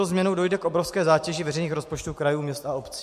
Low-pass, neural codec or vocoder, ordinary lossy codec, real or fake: 14.4 kHz; none; MP3, 64 kbps; real